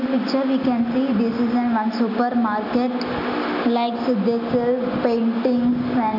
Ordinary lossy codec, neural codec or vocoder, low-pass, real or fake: none; none; 5.4 kHz; real